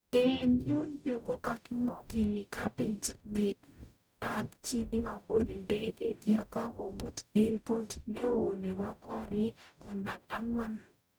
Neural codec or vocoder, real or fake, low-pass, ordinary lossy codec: codec, 44.1 kHz, 0.9 kbps, DAC; fake; none; none